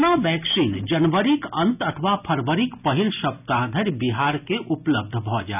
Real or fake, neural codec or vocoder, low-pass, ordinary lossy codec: real; none; 3.6 kHz; none